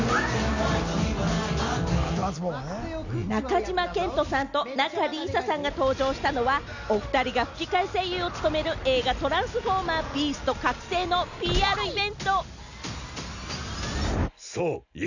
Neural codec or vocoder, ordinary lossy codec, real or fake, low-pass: none; none; real; 7.2 kHz